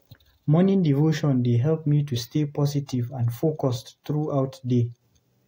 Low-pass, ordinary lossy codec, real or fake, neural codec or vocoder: 19.8 kHz; AAC, 48 kbps; real; none